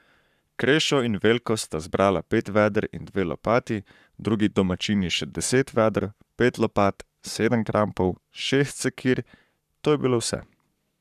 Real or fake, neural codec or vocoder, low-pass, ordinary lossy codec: fake; codec, 44.1 kHz, 7.8 kbps, Pupu-Codec; 14.4 kHz; none